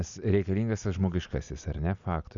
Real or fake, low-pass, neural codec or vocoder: real; 7.2 kHz; none